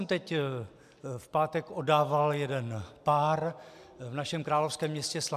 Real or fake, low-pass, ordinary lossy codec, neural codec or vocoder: real; 14.4 kHz; MP3, 96 kbps; none